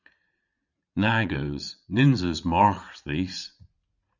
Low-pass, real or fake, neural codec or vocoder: 7.2 kHz; fake; vocoder, 44.1 kHz, 80 mel bands, Vocos